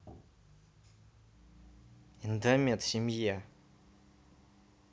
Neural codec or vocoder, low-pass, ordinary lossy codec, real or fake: none; none; none; real